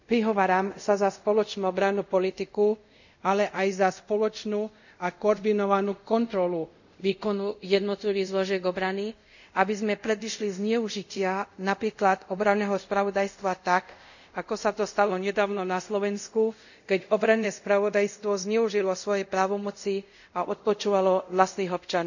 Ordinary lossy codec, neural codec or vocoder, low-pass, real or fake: none; codec, 24 kHz, 0.5 kbps, DualCodec; 7.2 kHz; fake